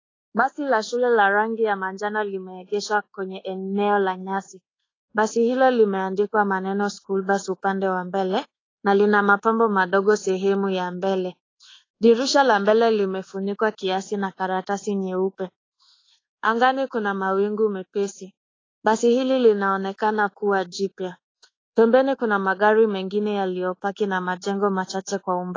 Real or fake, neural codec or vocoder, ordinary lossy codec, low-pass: fake; codec, 24 kHz, 1.2 kbps, DualCodec; AAC, 32 kbps; 7.2 kHz